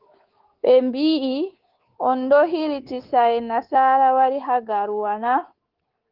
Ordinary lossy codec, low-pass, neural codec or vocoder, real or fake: Opus, 16 kbps; 5.4 kHz; codec, 24 kHz, 3.1 kbps, DualCodec; fake